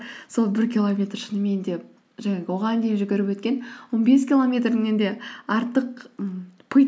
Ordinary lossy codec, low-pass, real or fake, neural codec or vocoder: none; none; real; none